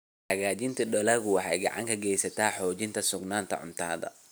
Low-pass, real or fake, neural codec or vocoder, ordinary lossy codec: none; real; none; none